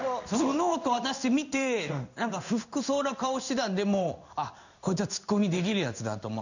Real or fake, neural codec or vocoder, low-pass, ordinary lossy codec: fake; codec, 16 kHz in and 24 kHz out, 1 kbps, XY-Tokenizer; 7.2 kHz; none